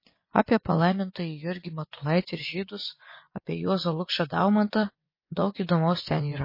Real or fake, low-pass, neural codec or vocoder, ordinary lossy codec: fake; 5.4 kHz; vocoder, 24 kHz, 100 mel bands, Vocos; MP3, 24 kbps